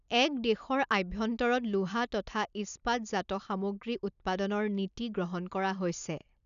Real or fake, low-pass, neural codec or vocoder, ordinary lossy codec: real; 7.2 kHz; none; none